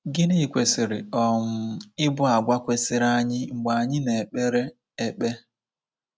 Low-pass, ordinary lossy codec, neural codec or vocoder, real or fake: none; none; none; real